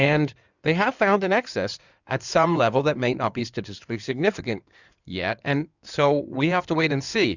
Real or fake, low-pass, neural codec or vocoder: fake; 7.2 kHz; codec, 16 kHz in and 24 kHz out, 2.2 kbps, FireRedTTS-2 codec